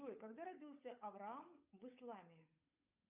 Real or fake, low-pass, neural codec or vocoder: fake; 3.6 kHz; codec, 16 kHz, 8 kbps, FunCodec, trained on Chinese and English, 25 frames a second